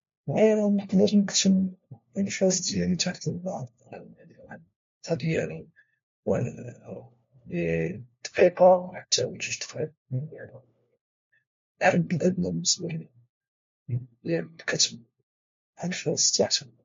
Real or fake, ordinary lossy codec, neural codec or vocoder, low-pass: fake; MP3, 48 kbps; codec, 16 kHz, 1 kbps, FunCodec, trained on LibriTTS, 50 frames a second; 7.2 kHz